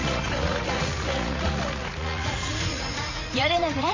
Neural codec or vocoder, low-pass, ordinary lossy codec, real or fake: vocoder, 44.1 kHz, 80 mel bands, Vocos; 7.2 kHz; MP3, 32 kbps; fake